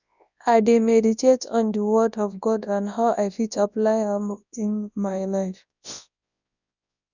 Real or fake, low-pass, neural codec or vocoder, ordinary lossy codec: fake; 7.2 kHz; codec, 24 kHz, 0.9 kbps, WavTokenizer, large speech release; none